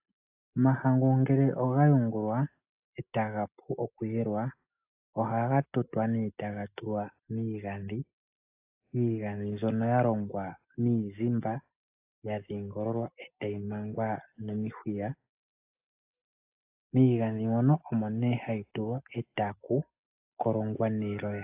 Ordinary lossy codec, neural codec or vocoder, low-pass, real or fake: AAC, 32 kbps; none; 3.6 kHz; real